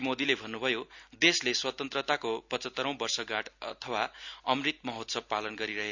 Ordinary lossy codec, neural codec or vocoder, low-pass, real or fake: Opus, 64 kbps; none; 7.2 kHz; real